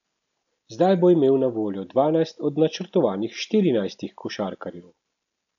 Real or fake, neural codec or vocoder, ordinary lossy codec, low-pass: real; none; none; 7.2 kHz